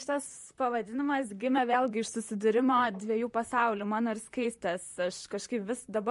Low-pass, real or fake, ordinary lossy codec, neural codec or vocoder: 14.4 kHz; fake; MP3, 48 kbps; vocoder, 44.1 kHz, 128 mel bands, Pupu-Vocoder